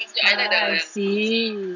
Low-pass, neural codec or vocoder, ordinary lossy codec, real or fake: 7.2 kHz; none; none; real